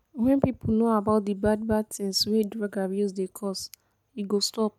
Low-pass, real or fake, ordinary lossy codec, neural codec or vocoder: 19.8 kHz; real; none; none